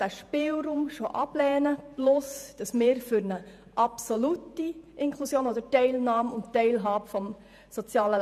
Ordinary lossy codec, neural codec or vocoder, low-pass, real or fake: none; vocoder, 48 kHz, 128 mel bands, Vocos; 14.4 kHz; fake